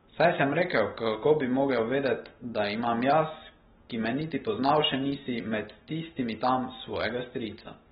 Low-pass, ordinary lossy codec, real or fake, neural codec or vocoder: 19.8 kHz; AAC, 16 kbps; real; none